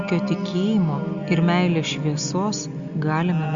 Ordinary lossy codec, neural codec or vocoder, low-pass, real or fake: Opus, 64 kbps; none; 7.2 kHz; real